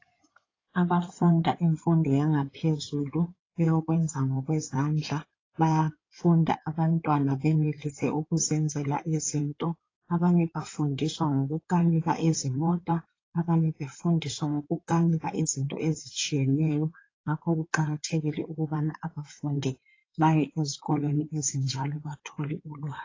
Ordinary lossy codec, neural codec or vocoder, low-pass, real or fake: AAC, 32 kbps; codec, 16 kHz in and 24 kHz out, 2.2 kbps, FireRedTTS-2 codec; 7.2 kHz; fake